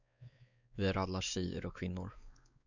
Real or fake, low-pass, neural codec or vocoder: fake; 7.2 kHz; codec, 16 kHz, 4 kbps, X-Codec, WavLM features, trained on Multilingual LibriSpeech